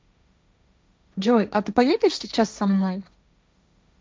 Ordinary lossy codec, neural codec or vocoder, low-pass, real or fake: none; codec, 16 kHz, 1.1 kbps, Voila-Tokenizer; none; fake